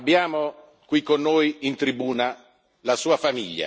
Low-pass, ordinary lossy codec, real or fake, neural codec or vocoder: none; none; real; none